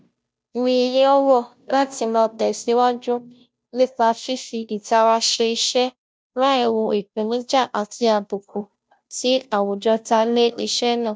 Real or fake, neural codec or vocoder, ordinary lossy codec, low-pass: fake; codec, 16 kHz, 0.5 kbps, FunCodec, trained on Chinese and English, 25 frames a second; none; none